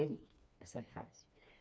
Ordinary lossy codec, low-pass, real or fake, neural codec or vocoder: none; none; fake; codec, 16 kHz, 2 kbps, FreqCodec, smaller model